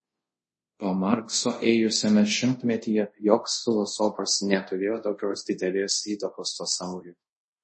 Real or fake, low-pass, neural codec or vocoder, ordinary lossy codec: fake; 10.8 kHz; codec, 24 kHz, 0.5 kbps, DualCodec; MP3, 32 kbps